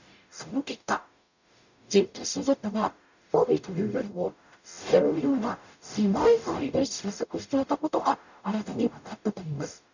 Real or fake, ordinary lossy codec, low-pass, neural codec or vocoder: fake; none; 7.2 kHz; codec, 44.1 kHz, 0.9 kbps, DAC